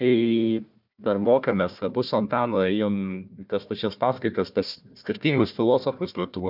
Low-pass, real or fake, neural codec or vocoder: 5.4 kHz; fake; codec, 16 kHz, 1 kbps, FunCodec, trained on Chinese and English, 50 frames a second